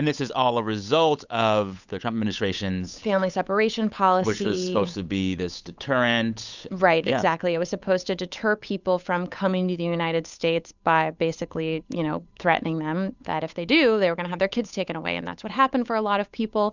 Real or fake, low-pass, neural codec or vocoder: fake; 7.2 kHz; codec, 16 kHz, 8 kbps, FunCodec, trained on Chinese and English, 25 frames a second